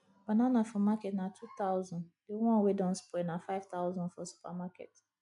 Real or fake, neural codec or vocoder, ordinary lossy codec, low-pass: real; none; none; 10.8 kHz